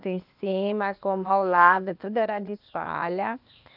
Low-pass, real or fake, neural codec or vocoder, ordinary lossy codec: 5.4 kHz; fake; codec, 16 kHz, 0.8 kbps, ZipCodec; none